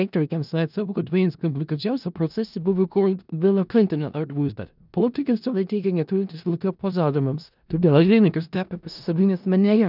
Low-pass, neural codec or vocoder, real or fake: 5.4 kHz; codec, 16 kHz in and 24 kHz out, 0.4 kbps, LongCat-Audio-Codec, four codebook decoder; fake